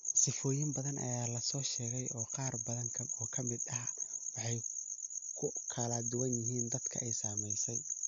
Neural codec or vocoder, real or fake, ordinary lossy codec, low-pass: none; real; MP3, 64 kbps; 7.2 kHz